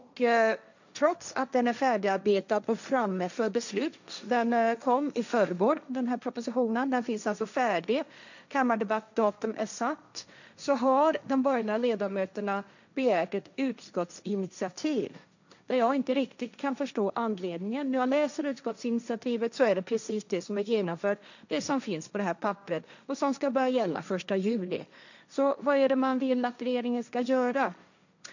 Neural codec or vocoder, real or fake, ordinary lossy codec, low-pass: codec, 16 kHz, 1.1 kbps, Voila-Tokenizer; fake; none; 7.2 kHz